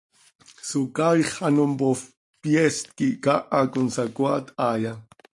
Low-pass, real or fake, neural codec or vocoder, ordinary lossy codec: 10.8 kHz; real; none; AAC, 64 kbps